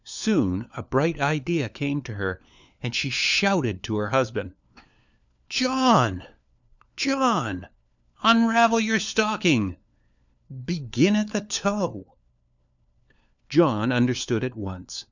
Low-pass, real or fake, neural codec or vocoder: 7.2 kHz; fake; codec, 16 kHz, 4 kbps, FunCodec, trained on LibriTTS, 50 frames a second